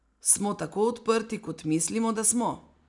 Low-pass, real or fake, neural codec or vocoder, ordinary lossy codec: 10.8 kHz; real; none; none